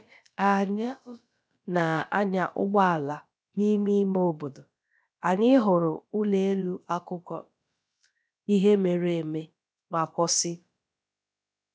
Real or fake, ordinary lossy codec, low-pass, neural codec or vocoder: fake; none; none; codec, 16 kHz, about 1 kbps, DyCAST, with the encoder's durations